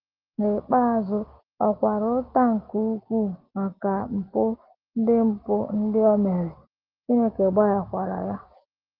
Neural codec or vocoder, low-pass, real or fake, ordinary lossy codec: none; 5.4 kHz; real; Opus, 16 kbps